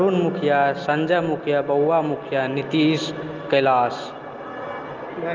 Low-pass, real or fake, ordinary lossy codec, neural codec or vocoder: 7.2 kHz; real; Opus, 24 kbps; none